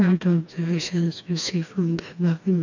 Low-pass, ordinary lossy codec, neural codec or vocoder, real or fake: 7.2 kHz; none; codec, 16 kHz, 2 kbps, FreqCodec, smaller model; fake